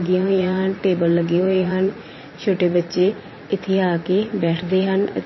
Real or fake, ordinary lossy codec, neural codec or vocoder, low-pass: fake; MP3, 24 kbps; vocoder, 44.1 kHz, 80 mel bands, Vocos; 7.2 kHz